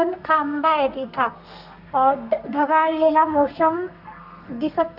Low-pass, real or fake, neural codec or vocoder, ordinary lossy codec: 5.4 kHz; fake; codec, 32 kHz, 1.9 kbps, SNAC; none